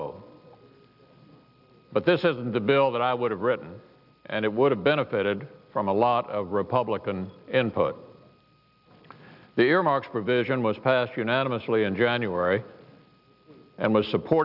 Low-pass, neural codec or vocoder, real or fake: 5.4 kHz; none; real